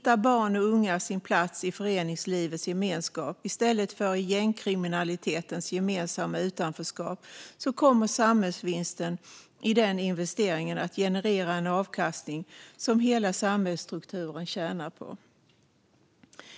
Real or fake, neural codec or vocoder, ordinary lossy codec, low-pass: real; none; none; none